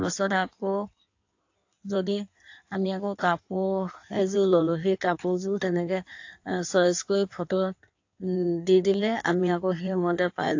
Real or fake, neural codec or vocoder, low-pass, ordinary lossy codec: fake; codec, 16 kHz in and 24 kHz out, 1.1 kbps, FireRedTTS-2 codec; 7.2 kHz; AAC, 48 kbps